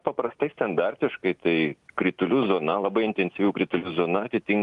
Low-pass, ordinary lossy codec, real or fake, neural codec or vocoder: 10.8 kHz; Opus, 32 kbps; real; none